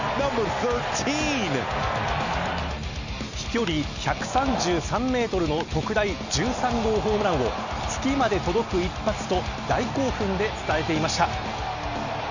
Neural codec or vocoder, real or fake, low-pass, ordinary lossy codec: none; real; 7.2 kHz; none